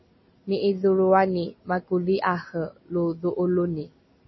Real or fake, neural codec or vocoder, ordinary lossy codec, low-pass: real; none; MP3, 24 kbps; 7.2 kHz